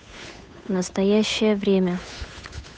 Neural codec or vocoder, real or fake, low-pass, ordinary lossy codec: codec, 16 kHz, 8 kbps, FunCodec, trained on Chinese and English, 25 frames a second; fake; none; none